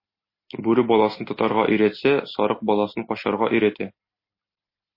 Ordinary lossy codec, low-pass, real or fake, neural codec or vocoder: MP3, 24 kbps; 5.4 kHz; real; none